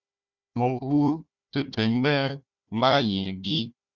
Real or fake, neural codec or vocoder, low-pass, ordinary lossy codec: fake; codec, 16 kHz, 1 kbps, FunCodec, trained on Chinese and English, 50 frames a second; 7.2 kHz; Opus, 64 kbps